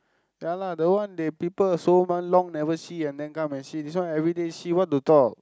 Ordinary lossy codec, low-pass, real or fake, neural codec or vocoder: none; none; real; none